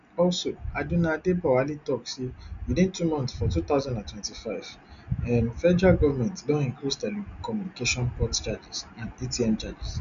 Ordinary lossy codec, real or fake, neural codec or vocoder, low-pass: none; real; none; 7.2 kHz